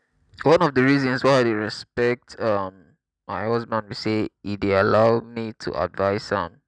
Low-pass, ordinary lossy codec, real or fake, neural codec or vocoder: 9.9 kHz; none; real; none